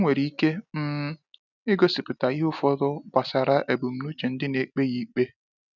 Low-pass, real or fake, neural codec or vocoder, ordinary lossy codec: 7.2 kHz; real; none; none